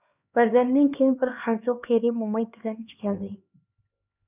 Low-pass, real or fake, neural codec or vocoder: 3.6 kHz; fake; codec, 16 kHz, 2 kbps, X-Codec, HuBERT features, trained on LibriSpeech